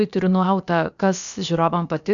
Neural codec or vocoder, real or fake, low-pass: codec, 16 kHz, about 1 kbps, DyCAST, with the encoder's durations; fake; 7.2 kHz